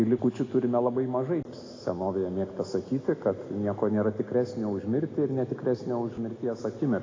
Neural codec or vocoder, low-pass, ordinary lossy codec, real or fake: none; 7.2 kHz; AAC, 32 kbps; real